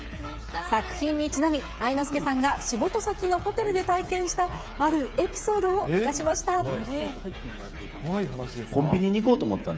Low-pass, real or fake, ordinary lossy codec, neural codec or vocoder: none; fake; none; codec, 16 kHz, 8 kbps, FreqCodec, smaller model